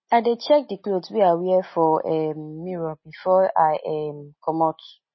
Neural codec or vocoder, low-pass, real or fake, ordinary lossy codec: none; 7.2 kHz; real; MP3, 24 kbps